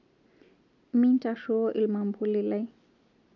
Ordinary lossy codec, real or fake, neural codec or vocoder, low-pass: none; real; none; 7.2 kHz